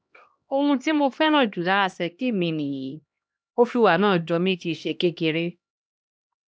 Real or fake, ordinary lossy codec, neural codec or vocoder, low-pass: fake; none; codec, 16 kHz, 1 kbps, X-Codec, HuBERT features, trained on LibriSpeech; none